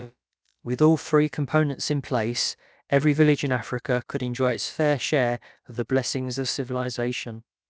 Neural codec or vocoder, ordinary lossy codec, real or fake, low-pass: codec, 16 kHz, about 1 kbps, DyCAST, with the encoder's durations; none; fake; none